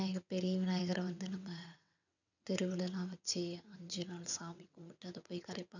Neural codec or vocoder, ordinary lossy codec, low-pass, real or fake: vocoder, 22.05 kHz, 80 mel bands, WaveNeXt; none; 7.2 kHz; fake